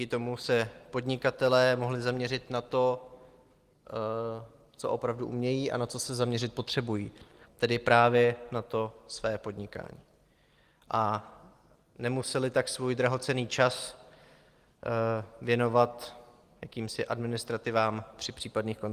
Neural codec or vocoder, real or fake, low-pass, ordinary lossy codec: none; real; 14.4 kHz; Opus, 24 kbps